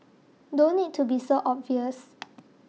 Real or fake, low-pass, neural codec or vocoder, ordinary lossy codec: real; none; none; none